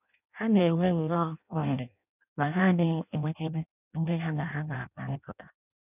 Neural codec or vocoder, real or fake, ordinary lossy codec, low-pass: codec, 16 kHz in and 24 kHz out, 0.6 kbps, FireRedTTS-2 codec; fake; none; 3.6 kHz